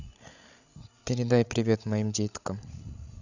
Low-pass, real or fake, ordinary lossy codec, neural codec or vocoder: 7.2 kHz; fake; none; codec, 16 kHz, 16 kbps, FreqCodec, larger model